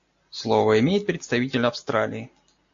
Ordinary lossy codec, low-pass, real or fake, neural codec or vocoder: AAC, 48 kbps; 7.2 kHz; real; none